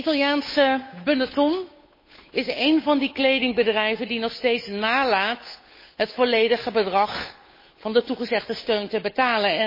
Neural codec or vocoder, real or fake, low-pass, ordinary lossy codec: codec, 16 kHz, 8 kbps, FunCodec, trained on Chinese and English, 25 frames a second; fake; 5.4 kHz; MP3, 24 kbps